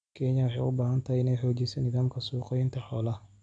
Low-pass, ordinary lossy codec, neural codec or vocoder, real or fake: 9.9 kHz; none; none; real